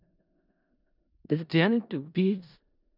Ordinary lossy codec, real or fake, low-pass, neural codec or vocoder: none; fake; 5.4 kHz; codec, 16 kHz in and 24 kHz out, 0.4 kbps, LongCat-Audio-Codec, four codebook decoder